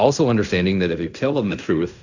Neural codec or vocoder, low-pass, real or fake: codec, 16 kHz in and 24 kHz out, 0.4 kbps, LongCat-Audio-Codec, fine tuned four codebook decoder; 7.2 kHz; fake